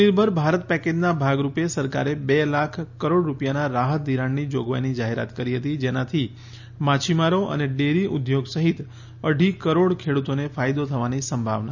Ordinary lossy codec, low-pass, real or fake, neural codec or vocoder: none; 7.2 kHz; real; none